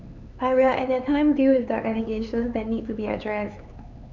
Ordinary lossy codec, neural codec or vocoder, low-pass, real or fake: none; codec, 16 kHz, 4 kbps, X-Codec, HuBERT features, trained on LibriSpeech; 7.2 kHz; fake